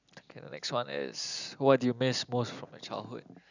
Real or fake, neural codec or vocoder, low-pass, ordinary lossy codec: real; none; 7.2 kHz; none